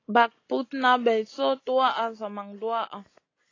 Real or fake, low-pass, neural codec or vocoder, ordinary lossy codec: real; 7.2 kHz; none; AAC, 32 kbps